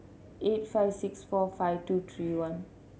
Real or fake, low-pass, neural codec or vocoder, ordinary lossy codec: real; none; none; none